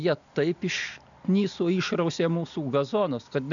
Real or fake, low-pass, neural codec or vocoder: real; 7.2 kHz; none